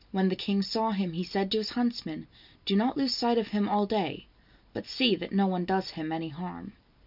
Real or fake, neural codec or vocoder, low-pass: real; none; 5.4 kHz